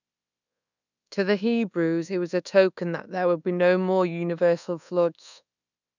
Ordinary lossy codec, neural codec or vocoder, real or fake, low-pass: none; codec, 24 kHz, 1.2 kbps, DualCodec; fake; 7.2 kHz